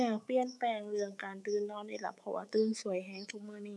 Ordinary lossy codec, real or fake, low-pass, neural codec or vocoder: none; fake; none; codec, 24 kHz, 3.1 kbps, DualCodec